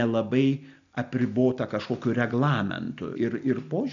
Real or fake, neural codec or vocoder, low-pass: real; none; 7.2 kHz